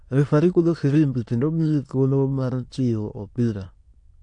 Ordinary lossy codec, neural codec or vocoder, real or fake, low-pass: AAC, 48 kbps; autoencoder, 22.05 kHz, a latent of 192 numbers a frame, VITS, trained on many speakers; fake; 9.9 kHz